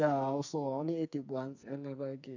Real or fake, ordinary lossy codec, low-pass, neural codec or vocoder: fake; none; 7.2 kHz; codec, 44.1 kHz, 2.6 kbps, SNAC